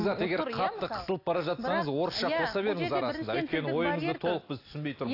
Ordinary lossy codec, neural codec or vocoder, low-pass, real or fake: AAC, 32 kbps; none; 5.4 kHz; real